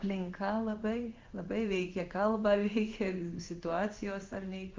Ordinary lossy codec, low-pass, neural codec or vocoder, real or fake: Opus, 24 kbps; 7.2 kHz; codec, 16 kHz in and 24 kHz out, 1 kbps, XY-Tokenizer; fake